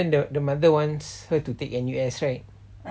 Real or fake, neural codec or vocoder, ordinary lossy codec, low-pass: real; none; none; none